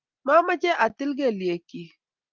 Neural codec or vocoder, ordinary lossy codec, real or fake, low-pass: none; Opus, 24 kbps; real; 7.2 kHz